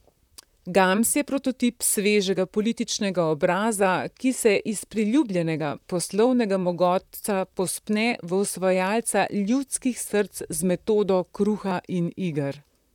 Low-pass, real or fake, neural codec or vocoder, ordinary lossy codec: 19.8 kHz; fake; vocoder, 44.1 kHz, 128 mel bands, Pupu-Vocoder; none